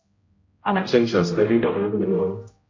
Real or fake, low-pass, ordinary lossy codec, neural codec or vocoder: fake; 7.2 kHz; MP3, 48 kbps; codec, 16 kHz, 0.5 kbps, X-Codec, HuBERT features, trained on general audio